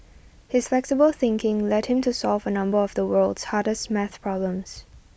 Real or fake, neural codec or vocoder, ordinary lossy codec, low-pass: real; none; none; none